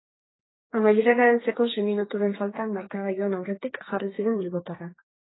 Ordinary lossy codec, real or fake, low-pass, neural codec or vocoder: AAC, 16 kbps; fake; 7.2 kHz; codec, 32 kHz, 1.9 kbps, SNAC